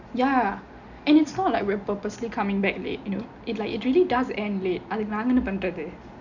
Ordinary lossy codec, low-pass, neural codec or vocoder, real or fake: none; 7.2 kHz; none; real